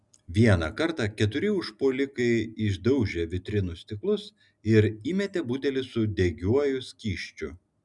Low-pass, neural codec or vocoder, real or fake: 10.8 kHz; none; real